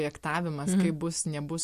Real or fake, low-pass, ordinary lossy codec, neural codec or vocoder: real; 14.4 kHz; MP3, 64 kbps; none